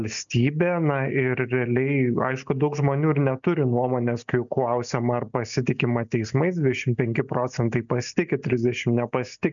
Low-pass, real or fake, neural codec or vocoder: 7.2 kHz; real; none